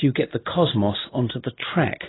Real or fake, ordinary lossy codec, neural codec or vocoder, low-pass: real; AAC, 16 kbps; none; 7.2 kHz